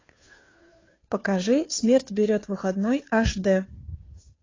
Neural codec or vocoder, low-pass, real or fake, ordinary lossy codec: codec, 16 kHz, 2 kbps, FunCodec, trained on Chinese and English, 25 frames a second; 7.2 kHz; fake; AAC, 32 kbps